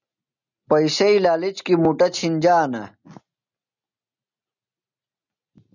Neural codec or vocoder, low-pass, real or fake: none; 7.2 kHz; real